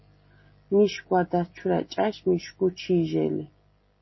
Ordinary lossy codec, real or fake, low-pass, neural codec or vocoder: MP3, 24 kbps; real; 7.2 kHz; none